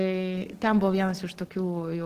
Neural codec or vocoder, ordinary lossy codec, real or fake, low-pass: codec, 44.1 kHz, 7.8 kbps, DAC; Opus, 16 kbps; fake; 14.4 kHz